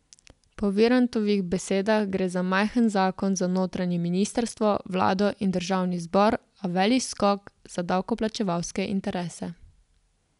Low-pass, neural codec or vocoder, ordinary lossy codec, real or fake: 10.8 kHz; none; none; real